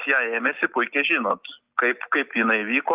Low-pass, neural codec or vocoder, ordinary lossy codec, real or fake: 3.6 kHz; none; Opus, 24 kbps; real